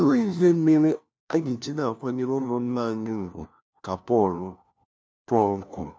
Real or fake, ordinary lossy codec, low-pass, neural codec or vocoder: fake; none; none; codec, 16 kHz, 1 kbps, FunCodec, trained on LibriTTS, 50 frames a second